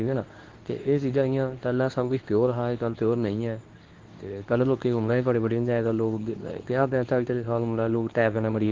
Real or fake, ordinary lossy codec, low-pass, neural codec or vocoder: fake; Opus, 16 kbps; 7.2 kHz; codec, 24 kHz, 0.9 kbps, WavTokenizer, medium speech release version 2